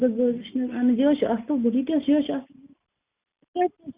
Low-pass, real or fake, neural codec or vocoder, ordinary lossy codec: 3.6 kHz; real; none; Opus, 64 kbps